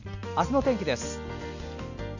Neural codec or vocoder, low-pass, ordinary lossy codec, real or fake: none; 7.2 kHz; none; real